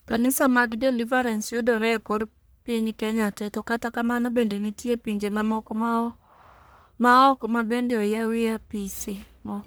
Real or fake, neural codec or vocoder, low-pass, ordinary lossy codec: fake; codec, 44.1 kHz, 1.7 kbps, Pupu-Codec; none; none